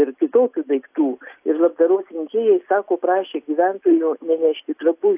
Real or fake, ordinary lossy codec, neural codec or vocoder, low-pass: real; AAC, 32 kbps; none; 3.6 kHz